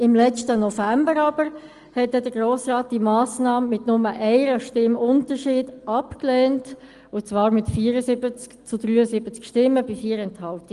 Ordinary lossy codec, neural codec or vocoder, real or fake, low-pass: Opus, 24 kbps; none; real; 10.8 kHz